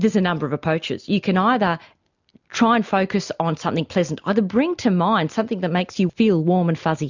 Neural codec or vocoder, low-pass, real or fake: none; 7.2 kHz; real